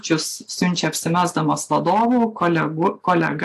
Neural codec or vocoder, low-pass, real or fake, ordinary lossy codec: none; 14.4 kHz; real; AAC, 64 kbps